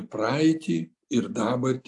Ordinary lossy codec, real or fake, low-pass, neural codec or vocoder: AAC, 64 kbps; real; 10.8 kHz; none